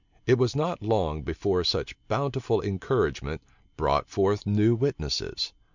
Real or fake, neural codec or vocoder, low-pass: real; none; 7.2 kHz